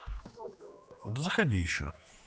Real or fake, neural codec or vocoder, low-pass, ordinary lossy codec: fake; codec, 16 kHz, 2 kbps, X-Codec, HuBERT features, trained on general audio; none; none